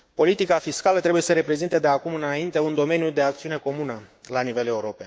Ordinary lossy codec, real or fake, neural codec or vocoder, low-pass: none; fake; codec, 16 kHz, 6 kbps, DAC; none